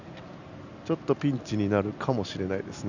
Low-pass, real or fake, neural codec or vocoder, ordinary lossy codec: 7.2 kHz; real; none; none